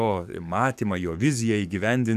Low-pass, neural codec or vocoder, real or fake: 14.4 kHz; codec, 44.1 kHz, 7.8 kbps, DAC; fake